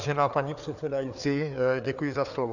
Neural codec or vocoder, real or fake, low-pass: codec, 16 kHz, 4 kbps, FreqCodec, larger model; fake; 7.2 kHz